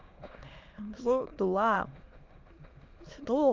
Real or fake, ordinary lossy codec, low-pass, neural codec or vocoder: fake; Opus, 32 kbps; 7.2 kHz; autoencoder, 22.05 kHz, a latent of 192 numbers a frame, VITS, trained on many speakers